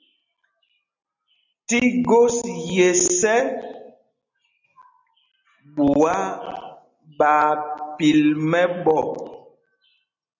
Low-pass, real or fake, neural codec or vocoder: 7.2 kHz; real; none